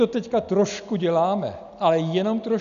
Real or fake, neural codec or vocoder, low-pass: real; none; 7.2 kHz